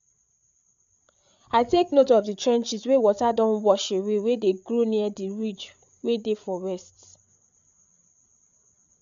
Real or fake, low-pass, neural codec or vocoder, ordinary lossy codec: fake; 7.2 kHz; codec, 16 kHz, 8 kbps, FreqCodec, larger model; none